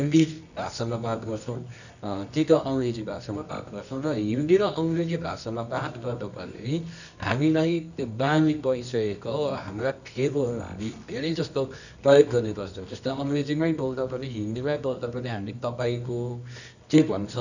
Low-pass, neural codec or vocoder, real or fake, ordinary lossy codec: 7.2 kHz; codec, 24 kHz, 0.9 kbps, WavTokenizer, medium music audio release; fake; none